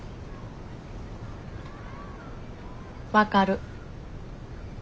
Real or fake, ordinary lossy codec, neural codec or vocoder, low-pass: real; none; none; none